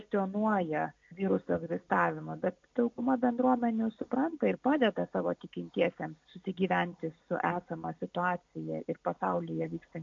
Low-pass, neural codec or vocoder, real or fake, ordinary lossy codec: 7.2 kHz; none; real; MP3, 48 kbps